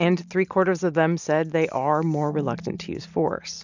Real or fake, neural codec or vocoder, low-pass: real; none; 7.2 kHz